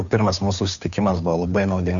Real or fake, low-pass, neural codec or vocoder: fake; 7.2 kHz; codec, 16 kHz, 2 kbps, FunCodec, trained on Chinese and English, 25 frames a second